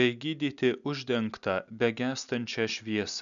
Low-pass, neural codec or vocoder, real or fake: 7.2 kHz; none; real